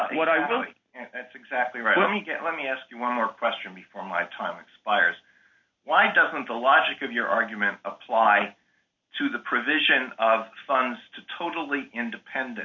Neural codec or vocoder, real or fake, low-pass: none; real; 7.2 kHz